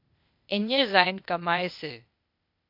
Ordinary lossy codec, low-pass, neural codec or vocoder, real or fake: MP3, 48 kbps; 5.4 kHz; codec, 16 kHz, 0.8 kbps, ZipCodec; fake